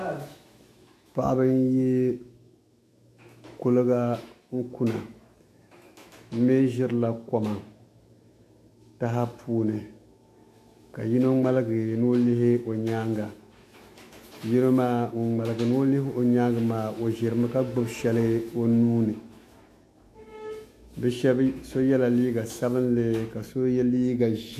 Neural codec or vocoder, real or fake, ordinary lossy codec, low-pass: autoencoder, 48 kHz, 128 numbers a frame, DAC-VAE, trained on Japanese speech; fake; AAC, 64 kbps; 14.4 kHz